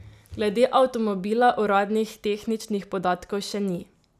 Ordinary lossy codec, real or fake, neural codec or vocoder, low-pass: none; real; none; 14.4 kHz